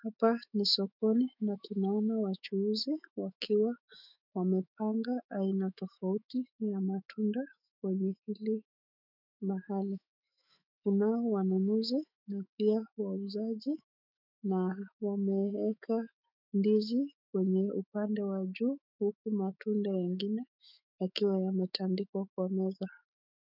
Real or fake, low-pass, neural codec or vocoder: fake; 5.4 kHz; autoencoder, 48 kHz, 128 numbers a frame, DAC-VAE, trained on Japanese speech